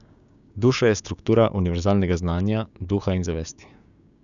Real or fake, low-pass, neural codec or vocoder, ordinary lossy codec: fake; 7.2 kHz; codec, 16 kHz, 6 kbps, DAC; none